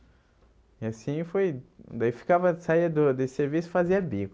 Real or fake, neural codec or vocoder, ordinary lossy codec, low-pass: real; none; none; none